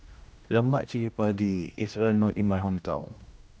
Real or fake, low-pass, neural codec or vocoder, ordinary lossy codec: fake; none; codec, 16 kHz, 1 kbps, X-Codec, HuBERT features, trained on general audio; none